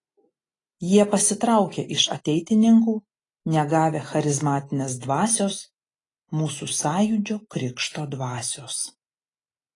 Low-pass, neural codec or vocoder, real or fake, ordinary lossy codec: 10.8 kHz; none; real; AAC, 32 kbps